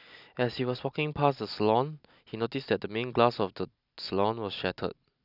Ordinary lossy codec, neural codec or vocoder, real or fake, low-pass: none; none; real; 5.4 kHz